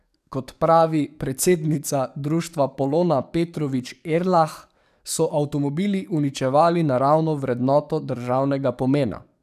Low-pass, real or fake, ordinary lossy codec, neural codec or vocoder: 14.4 kHz; fake; none; codec, 44.1 kHz, 7.8 kbps, DAC